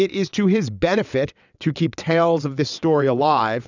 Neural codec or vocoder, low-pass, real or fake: vocoder, 22.05 kHz, 80 mel bands, WaveNeXt; 7.2 kHz; fake